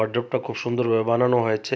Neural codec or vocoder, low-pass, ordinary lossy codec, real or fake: none; none; none; real